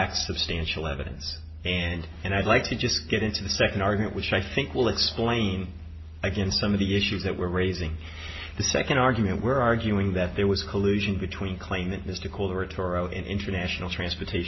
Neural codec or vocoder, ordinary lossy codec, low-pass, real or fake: none; MP3, 24 kbps; 7.2 kHz; real